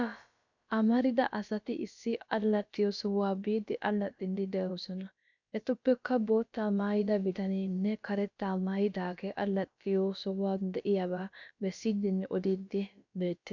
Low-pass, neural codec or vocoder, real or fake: 7.2 kHz; codec, 16 kHz, about 1 kbps, DyCAST, with the encoder's durations; fake